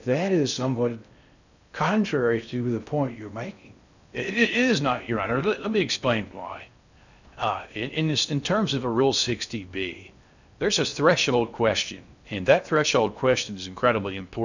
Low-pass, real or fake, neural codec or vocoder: 7.2 kHz; fake; codec, 16 kHz in and 24 kHz out, 0.6 kbps, FocalCodec, streaming, 4096 codes